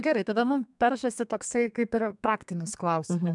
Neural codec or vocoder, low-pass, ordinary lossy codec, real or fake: codec, 32 kHz, 1.9 kbps, SNAC; 10.8 kHz; MP3, 96 kbps; fake